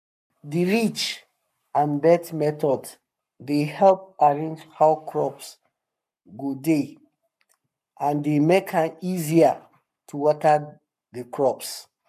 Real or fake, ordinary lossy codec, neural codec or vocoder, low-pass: fake; none; codec, 44.1 kHz, 7.8 kbps, Pupu-Codec; 14.4 kHz